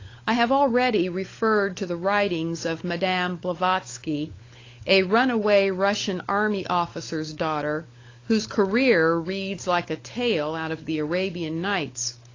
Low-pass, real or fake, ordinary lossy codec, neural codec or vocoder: 7.2 kHz; fake; AAC, 32 kbps; codec, 16 kHz, 16 kbps, FunCodec, trained on LibriTTS, 50 frames a second